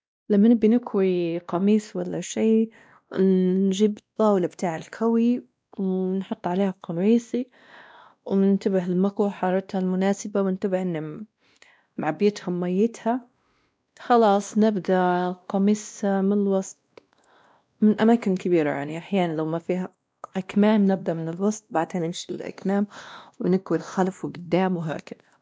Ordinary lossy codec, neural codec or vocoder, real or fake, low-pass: none; codec, 16 kHz, 1 kbps, X-Codec, WavLM features, trained on Multilingual LibriSpeech; fake; none